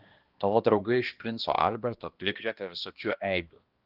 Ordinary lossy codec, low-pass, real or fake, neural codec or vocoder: Opus, 32 kbps; 5.4 kHz; fake; codec, 16 kHz, 1 kbps, X-Codec, HuBERT features, trained on balanced general audio